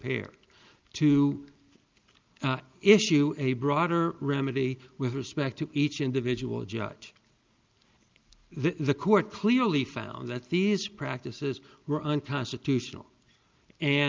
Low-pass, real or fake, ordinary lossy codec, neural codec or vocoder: 7.2 kHz; real; Opus, 24 kbps; none